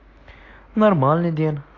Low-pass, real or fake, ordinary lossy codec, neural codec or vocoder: 7.2 kHz; real; AAC, 32 kbps; none